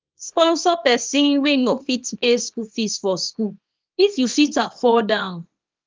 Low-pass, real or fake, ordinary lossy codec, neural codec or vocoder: 7.2 kHz; fake; Opus, 32 kbps; codec, 24 kHz, 0.9 kbps, WavTokenizer, small release